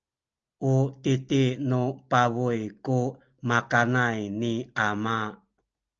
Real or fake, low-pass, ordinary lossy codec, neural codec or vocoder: real; 7.2 kHz; Opus, 24 kbps; none